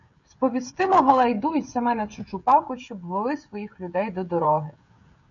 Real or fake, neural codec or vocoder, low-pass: fake; codec, 16 kHz, 16 kbps, FreqCodec, smaller model; 7.2 kHz